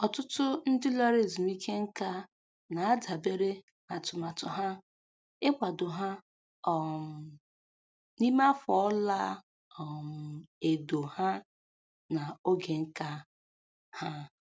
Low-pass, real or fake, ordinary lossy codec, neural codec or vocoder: none; real; none; none